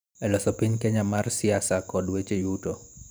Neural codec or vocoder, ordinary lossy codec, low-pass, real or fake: vocoder, 44.1 kHz, 128 mel bands every 512 samples, BigVGAN v2; none; none; fake